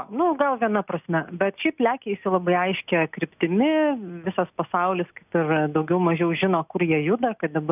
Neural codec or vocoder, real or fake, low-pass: none; real; 3.6 kHz